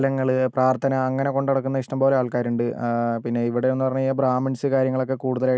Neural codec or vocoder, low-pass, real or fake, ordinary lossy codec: none; none; real; none